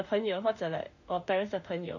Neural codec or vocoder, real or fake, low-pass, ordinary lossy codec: autoencoder, 48 kHz, 32 numbers a frame, DAC-VAE, trained on Japanese speech; fake; 7.2 kHz; none